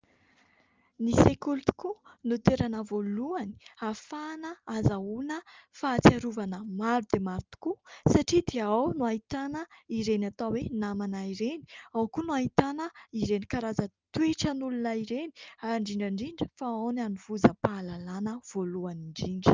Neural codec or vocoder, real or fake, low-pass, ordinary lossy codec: none; real; 7.2 kHz; Opus, 32 kbps